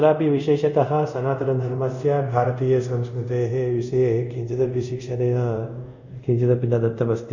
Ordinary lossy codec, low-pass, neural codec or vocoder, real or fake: none; 7.2 kHz; codec, 24 kHz, 0.5 kbps, DualCodec; fake